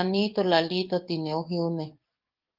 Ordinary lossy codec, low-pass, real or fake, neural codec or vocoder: Opus, 24 kbps; 5.4 kHz; fake; codec, 44.1 kHz, 7.8 kbps, DAC